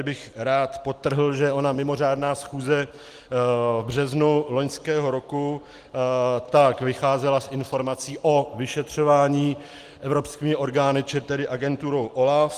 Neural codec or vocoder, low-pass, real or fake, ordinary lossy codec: none; 14.4 kHz; real; Opus, 24 kbps